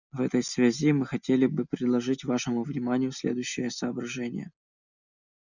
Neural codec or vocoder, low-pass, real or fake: none; 7.2 kHz; real